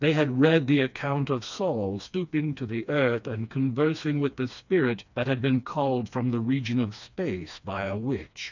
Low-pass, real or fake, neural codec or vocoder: 7.2 kHz; fake; codec, 16 kHz, 2 kbps, FreqCodec, smaller model